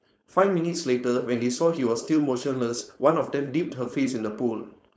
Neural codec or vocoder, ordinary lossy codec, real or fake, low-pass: codec, 16 kHz, 4.8 kbps, FACodec; none; fake; none